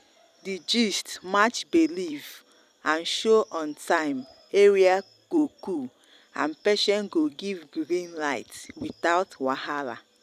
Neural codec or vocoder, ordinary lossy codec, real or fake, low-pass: vocoder, 44.1 kHz, 128 mel bands every 256 samples, BigVGAN v2; none; fake; 14.4 kHz